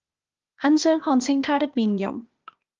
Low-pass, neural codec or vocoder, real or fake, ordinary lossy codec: 7.2 kHz; codec, 16 kHz, 0.8 kbps, ZipCodec; fake; Opus, 32 kbps